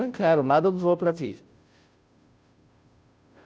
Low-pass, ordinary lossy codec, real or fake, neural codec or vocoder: none; none; fake; codec, 16 kHz, 0.5 kbps, FunCodec, trained on Chinese and English, 25 frames a second